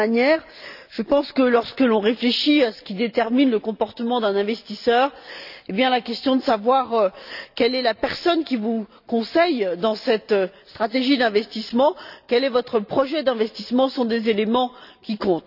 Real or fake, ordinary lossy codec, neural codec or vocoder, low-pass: real; none; none; 5.4 kHz